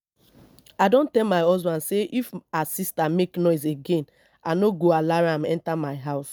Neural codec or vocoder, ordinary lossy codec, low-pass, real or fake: none; none; none; real